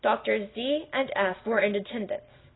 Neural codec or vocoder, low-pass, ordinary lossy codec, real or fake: none; 7.2 kHz; AAC, 16 kbps; real